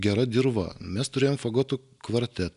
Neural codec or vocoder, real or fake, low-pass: none; real; 10.8 kHz